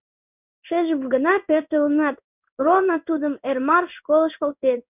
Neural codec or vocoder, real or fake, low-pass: codec, 16 kHz in and 24 kHz out, 1 kbps, XY-Tokenizer; fake; 3.6 kHz